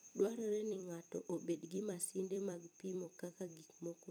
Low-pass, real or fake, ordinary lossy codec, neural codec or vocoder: none; fake; none; vocoder, 44.1 kHz, 128 mel bands every 256 samples, BigVGAN v2